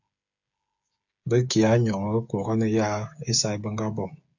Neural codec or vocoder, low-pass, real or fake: codec, 16 kHz, 8 kbps, FreqCodec, smaller model; 7.2 kHz; fake